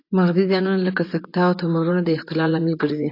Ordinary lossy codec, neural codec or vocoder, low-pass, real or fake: AAC, 32 kbps; vocoder, 24 kHz, 100 mel bands, Vocos; 5.4 kHz; fake